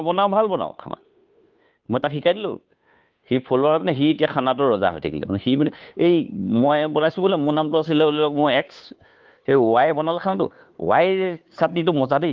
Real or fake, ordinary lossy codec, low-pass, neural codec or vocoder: fake; Opus, 24 kbps; 7.2 kHz; codec, 16 kHz, 2 kbps, FunCodec, trained on Chinese and English, 25 frames a second